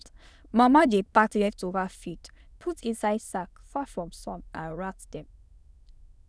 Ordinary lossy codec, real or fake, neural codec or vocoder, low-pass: none; fake; autoencoder, 22.05 kHz, a latent of 192 numbers a frame, VITS, trained on many speakers; none